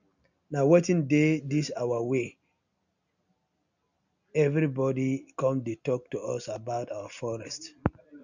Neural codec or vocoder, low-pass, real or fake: none; 7.2 kHz; real